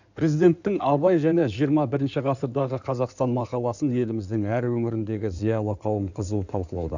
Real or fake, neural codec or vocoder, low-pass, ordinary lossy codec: fake; codec, 16 kHz in and 24 kHz out, 2.2 kbps, FireRedTTS-2 codec; 7.2 kHz; none